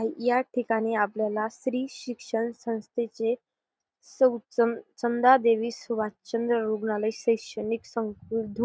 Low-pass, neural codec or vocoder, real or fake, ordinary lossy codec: none; none; real; none